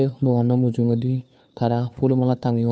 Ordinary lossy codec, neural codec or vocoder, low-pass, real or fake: none; codec, 16 kHz, 2 kbps, FunCodec, trained on Chinese and English, 25 frames a second; none; fake